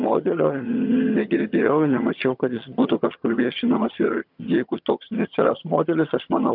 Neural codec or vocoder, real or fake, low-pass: vocoder, 22.05 kHz, 80 mel bands, HiFi-GAN; fake; 5.4 kHz